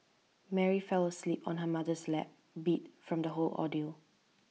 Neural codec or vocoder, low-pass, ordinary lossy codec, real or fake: none; none; none; real